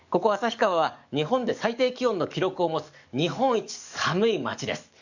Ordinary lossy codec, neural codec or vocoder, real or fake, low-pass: none; codec, 44.1 kHz, 7.8 kbps, DAC; fake; 7.2 kHz